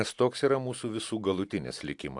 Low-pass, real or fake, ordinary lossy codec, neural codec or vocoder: 10.8 kHz; real; AAC, 64 kbps; none